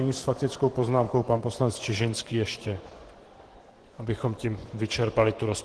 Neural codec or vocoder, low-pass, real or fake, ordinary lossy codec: vocoder, 24 kHz, 100 mel bands, Vocos; 10.8 kHz; fake; Opus, 16 kbps